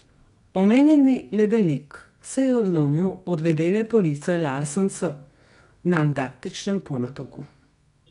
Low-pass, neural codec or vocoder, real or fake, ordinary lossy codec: 10.8 kHz; codec, 24 kHz, 0.9 kbps, WavTokenizer, medium music audio release; fake; none